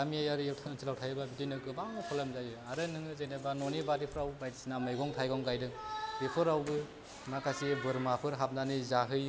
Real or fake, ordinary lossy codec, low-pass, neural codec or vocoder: real; none; none; none